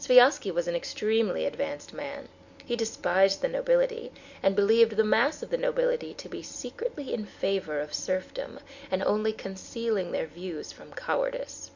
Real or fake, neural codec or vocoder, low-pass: real; none; 7.2 kHz